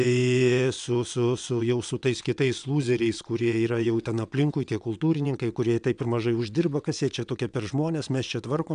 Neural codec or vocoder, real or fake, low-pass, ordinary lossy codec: vocoder, 22.05 kHz, 80 mel bands, WaveNeXt; fake; 9.9 kHz; MP3, 96 kbps